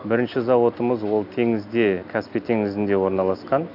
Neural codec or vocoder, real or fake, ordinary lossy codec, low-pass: none; real; AAC, 48 kbps; 5.4 kHz